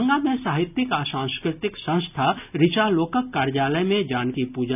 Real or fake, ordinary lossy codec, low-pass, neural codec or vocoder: real; none; 3.6 kHz; none